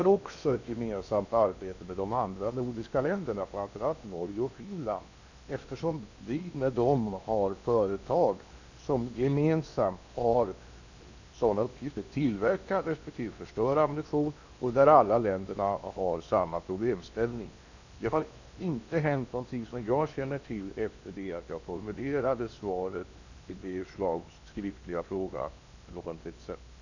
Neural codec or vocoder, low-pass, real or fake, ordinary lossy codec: codec, 16 kHz in and 24 kHz out, 0.8 kbps, FocalCodec, streaming, 65536 codes; 7.2 kHz; fake; none